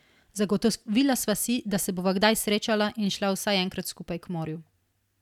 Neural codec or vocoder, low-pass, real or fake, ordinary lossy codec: none; 19.8 kHz; real; none